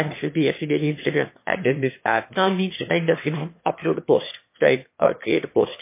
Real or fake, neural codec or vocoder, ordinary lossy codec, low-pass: fake; autoencoder, 22.05 kHz, a latent of 192 numbers a frame, VITS, trained on one speaker; MP3, 24 kbps; 3.6 kHz